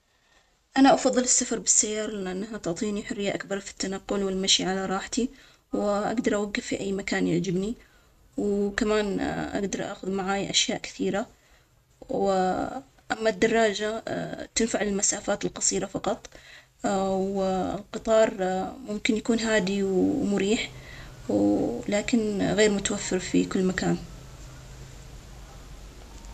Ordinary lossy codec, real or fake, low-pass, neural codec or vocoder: none; real; 14.4 kHz; none